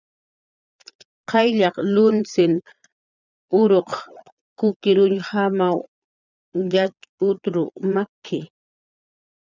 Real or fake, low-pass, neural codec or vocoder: fake; 7.2 kHz; vocoder, 22.05 kHz, 80 mel bands, Vocos